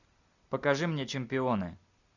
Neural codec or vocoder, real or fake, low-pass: none; real; 7.2 kHz